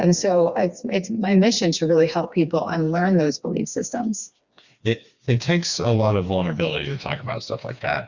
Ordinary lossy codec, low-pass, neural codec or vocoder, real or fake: Opus, 64 kbps; 7.2 kHz; codec, 16 kHz, 2 kbps, FreqCodec, smaller model; fake